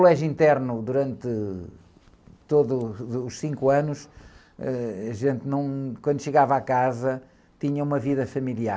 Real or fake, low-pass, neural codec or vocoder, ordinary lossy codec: real; none; none; none